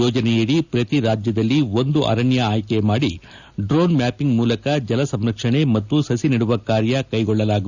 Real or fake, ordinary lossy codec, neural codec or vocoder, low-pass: real; none; none; 7.2 kHz